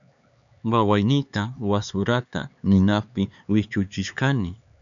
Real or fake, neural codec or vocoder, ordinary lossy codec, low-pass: fake; codec, 16 kHz, 4 kbps, X-Codec, HuBERT features, trained on LibriSpeech; MP3, 96 kbps; 7.2 kHz